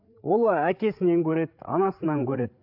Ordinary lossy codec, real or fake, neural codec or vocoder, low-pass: AAC, 48 kbps; fake; codec, 16 kHz, 8 kbps, FreqCodec, larger model; 5.4 kHz